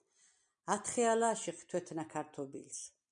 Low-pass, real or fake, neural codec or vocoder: 9.9 kHz; real; none